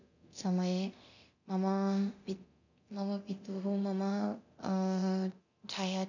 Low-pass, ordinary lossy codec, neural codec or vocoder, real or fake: 7.2 kHz; none; codec, 24 kHz, 0.9 kbps, DualCodec; fake